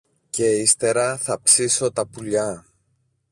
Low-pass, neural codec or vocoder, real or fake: 10.8 kHz; none; real